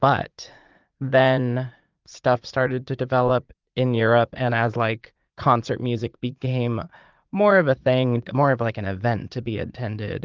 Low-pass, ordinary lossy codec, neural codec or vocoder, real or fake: 7.2 kHz; Opus, 32 kbps; vocoder, 44.1 kHz, 80 mel bands, Vocos; fake